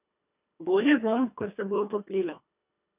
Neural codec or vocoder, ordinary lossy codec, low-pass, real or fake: codec, 24 kHz, 1.5 kbps, HILCodec; none; 3.6 kHz; fake